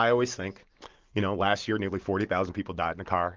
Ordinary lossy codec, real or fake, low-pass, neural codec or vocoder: Opus, 32 kbps; real; 7.2 kHz; none